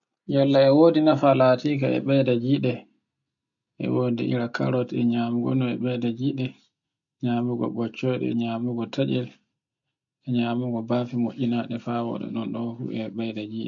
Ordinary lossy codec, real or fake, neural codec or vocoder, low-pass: none; real; none; 7.2 kHz